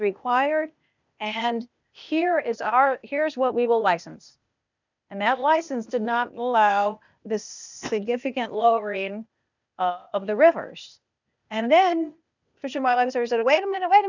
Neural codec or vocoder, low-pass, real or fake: codec, 16 kHz, 0.8 kbps, ZipCodec; 7.2 kHz; fake